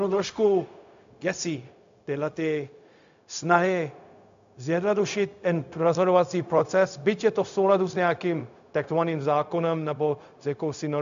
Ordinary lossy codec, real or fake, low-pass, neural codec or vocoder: MP3, 64 kbps; fake; 7.2 kHz; codec, 16 kHz, 0.4 kbps, LongCat-Audio-Codec